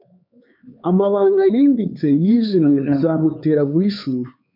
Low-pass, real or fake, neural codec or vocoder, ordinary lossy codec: 5.4 kHz; fake; codec, 16 kHz, 4 kbps, X-Codec, HuBERT features, trained on LibriSpeech; MP3, 48 kbps